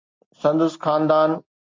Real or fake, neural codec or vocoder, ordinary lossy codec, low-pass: real; none; MP3, 64 kbps; 7.2 kHz